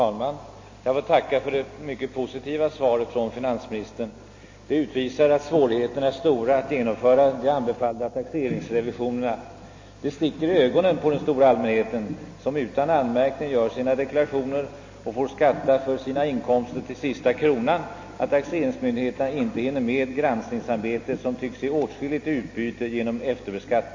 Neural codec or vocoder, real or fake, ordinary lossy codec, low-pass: none; real; MP3, 32 kbps; 7.2 kHz